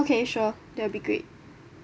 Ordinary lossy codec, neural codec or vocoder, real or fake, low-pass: none; none; real; none